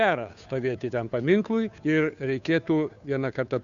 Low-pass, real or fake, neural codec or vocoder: 7.2 kHz; fake; codec, 16 kHz, 2 kbps, FunCodec, trained on Chinese and English, 25 frames a second